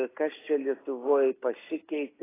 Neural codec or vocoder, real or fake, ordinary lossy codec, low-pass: none; real; AAC, 16 kbps; 3.6 kHz